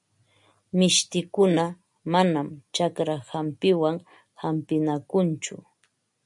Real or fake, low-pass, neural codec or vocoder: real; 10.8 kHz; none